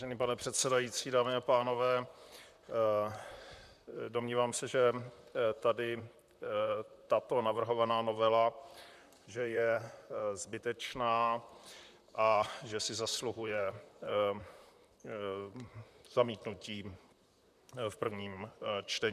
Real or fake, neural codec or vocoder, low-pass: fake; vocoder, 44.1 kHz, 128 mel bands, Pupu-Vocoder; 14.4 kHz